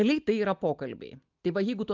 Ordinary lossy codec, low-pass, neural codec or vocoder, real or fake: Opus, 24 kbps; 7.2 kHz; none; real